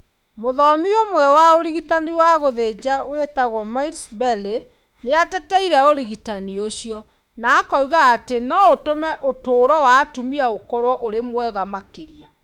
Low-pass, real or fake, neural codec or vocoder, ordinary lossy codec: 19.8 kHz; fake; autoencoder, 48 kHz, 32 numbers a frame, DAC-VAE, trained on Japanese speech; none